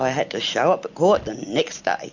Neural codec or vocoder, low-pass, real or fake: none; 7.2 kHz; real